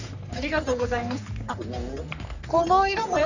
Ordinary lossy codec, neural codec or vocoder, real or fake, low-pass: none; codec, 44.1 kHz, 3.4 kbps, Pupu-Codec; fake; 7.2 kHz